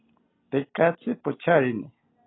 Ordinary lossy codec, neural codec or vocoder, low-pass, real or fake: AAC, 16 kbps; none; 7.2 kHz; real